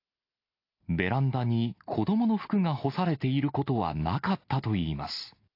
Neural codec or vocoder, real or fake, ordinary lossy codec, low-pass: none; real; AAC, 32 kbps; 5.4 kHz